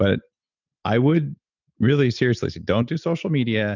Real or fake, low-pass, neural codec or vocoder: real; 7.2 kHz; none